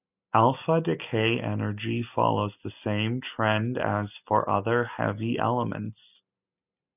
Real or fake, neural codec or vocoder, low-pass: real; none; 3.6 kHz